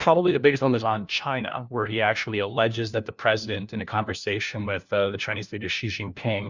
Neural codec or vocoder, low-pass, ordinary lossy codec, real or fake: codec, 16 kHz, 1 kbps, FunCodec, trained on LibriTTS, 50 frames a second; 7.2 kHz; Opus, 64 kbps; fake